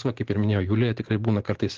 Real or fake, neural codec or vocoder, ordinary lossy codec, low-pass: real; none; Opus, 16 kbps; 7.2 kHz